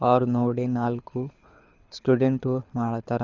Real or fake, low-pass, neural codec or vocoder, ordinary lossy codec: fake; 7.2 kHz; codec, 24 kHz, 6 kbps, HILCodec; Opus, 64 kbps